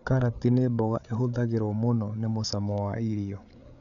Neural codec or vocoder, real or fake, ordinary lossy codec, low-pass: codec, 16 kHz, 8 kbps, FreqCodec, larger model; fake; none; 7.2 kHz